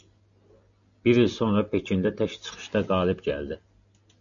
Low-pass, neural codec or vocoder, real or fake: 7.2 kHz; none; real